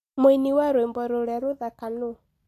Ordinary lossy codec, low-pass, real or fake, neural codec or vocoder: AAC, 64 kbps; 14.4 kHz; real; none